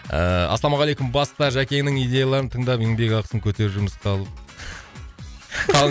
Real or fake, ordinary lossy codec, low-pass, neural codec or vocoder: real; none; none; none